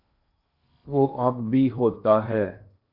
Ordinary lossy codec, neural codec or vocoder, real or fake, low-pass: AAC, 48 kbps; codec, 16 kHz in and 24 kHz out, 0.8 kbps, FocalCodec, streaming, 65536 codes; fake; 5.4 kHz